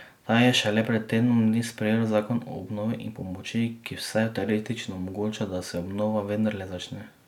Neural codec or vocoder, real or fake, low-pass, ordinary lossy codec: none; real; 19.8 kHz; none